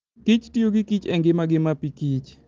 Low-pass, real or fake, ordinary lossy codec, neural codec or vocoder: 7.2 kHz; real; Opus, 16 kbps; none